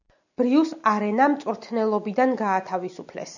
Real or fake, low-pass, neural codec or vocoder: real; 7.2 kHz; none